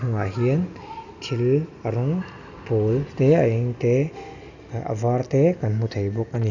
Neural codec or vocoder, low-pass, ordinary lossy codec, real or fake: none; 7.2 kHz; none; real